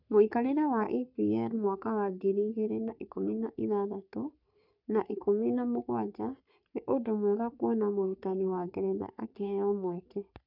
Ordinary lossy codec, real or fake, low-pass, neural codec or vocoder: none; fake; 5.4 kHz; codec, 44.1 kHz, 3.4 kbps, Pupu-Codec